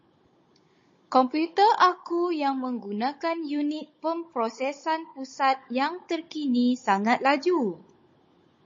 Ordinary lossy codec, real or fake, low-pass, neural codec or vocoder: MP3, 32 kbps; fake; 7.2 kHz; codec, 16 kHz, 16 kbps, FunCodec, trained on Chinese and English, 50 frames a second